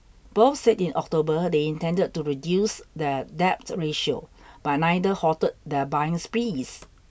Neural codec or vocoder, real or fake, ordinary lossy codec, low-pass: none; real; none; none